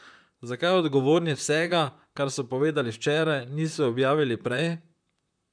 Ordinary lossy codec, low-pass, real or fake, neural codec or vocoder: none; 9.9 kHz; fake; vocoder, 44.1 kHz, 128 mel bands, Pupu-Vocoder